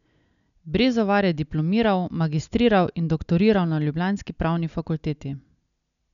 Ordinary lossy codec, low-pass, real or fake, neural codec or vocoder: none; 7.2 kHz; real; none